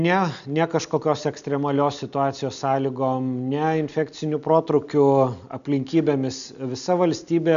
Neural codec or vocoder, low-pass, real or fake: none; 7.2 kHz; real